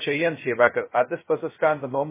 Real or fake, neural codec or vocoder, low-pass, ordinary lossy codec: fake; codec, 16 kHz, 0.2 kbps, FocalCodec; 3.6 kHz; MP3, 16 kbps